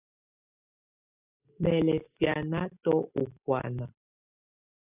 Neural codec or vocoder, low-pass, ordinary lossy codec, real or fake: none; 3.6 kHz; AAC, 24 kbps; real